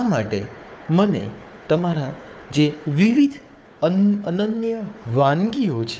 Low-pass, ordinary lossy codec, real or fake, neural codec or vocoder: none; none; fake; codec, 16 kHz, 4 kbps, FunCodec, trained on Chinese and English, 50 frames a second